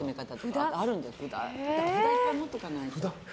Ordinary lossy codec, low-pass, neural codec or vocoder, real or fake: none; none; none; real